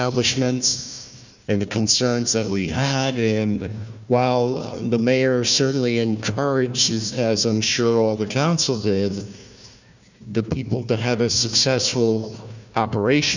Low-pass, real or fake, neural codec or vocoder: 7.2 kHz; fake; codec, 16 kHz, 1 kbps, FunCodec, trained on Chinese and English, 50 frames a second